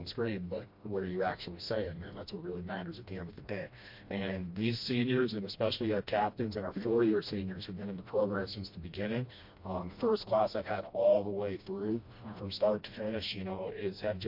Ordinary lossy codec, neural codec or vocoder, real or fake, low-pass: MP3, 32 kbps; codec, 16 kHz, 1 kbps, FreqCodec, smaller model; fake; 5.4 kHz